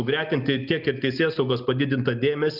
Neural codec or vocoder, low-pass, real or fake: none; 5.4 kHz; real